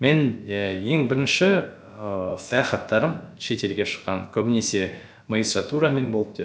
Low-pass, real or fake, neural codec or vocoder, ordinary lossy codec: none; fake; codec, 16 kHz, about 1 kbps, DyCAST, with the encoder's durations; none